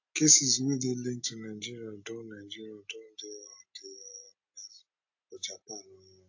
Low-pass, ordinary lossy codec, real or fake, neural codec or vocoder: 7.2 kHz; AAC, 48 kbps; real; none